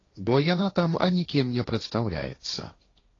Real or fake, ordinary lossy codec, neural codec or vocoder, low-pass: fake; AAC, 32 kbps; codec, 16 kHz, 1.1 kbps, Voila-Tokenizer; 7.2 kHz